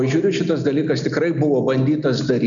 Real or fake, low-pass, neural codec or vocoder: real; 7.2 kHz; none